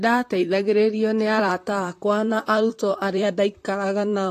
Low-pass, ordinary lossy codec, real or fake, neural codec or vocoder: 14.4 kHz; AAC, 48 kbps; fake; vocoder, 44.1 kHz, 128 mel bands, Pupu-Vocoder